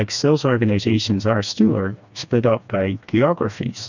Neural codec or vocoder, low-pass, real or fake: codec, 16 kHz, 2 kbps, FreqCodec, smaller model; 7.2 kHz; fake